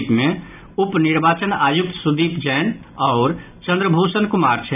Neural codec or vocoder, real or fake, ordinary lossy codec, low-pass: none; real; none; 3.6 kHz